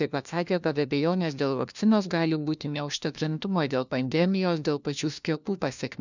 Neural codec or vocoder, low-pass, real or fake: codec, 16 kHz, 1 kbps, FunCodec, trained on LibriTTS, 50 frames a second; 7.2 kHz; fake